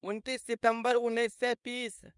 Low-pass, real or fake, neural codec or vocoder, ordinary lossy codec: 10.8 kHz; fake; codec, 24 kHz, 0.9 kbps, WavTokenizer, small release; none